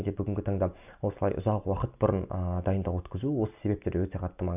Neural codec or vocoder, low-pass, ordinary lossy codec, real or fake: none; 3.6 kHz; none; real